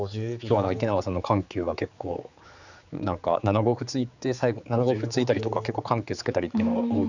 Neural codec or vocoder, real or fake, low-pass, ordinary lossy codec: codec, 16 kHz, 4 kbps, X-Codec, HuBERT features, trained on general audio; fake; 7.2 kHz; none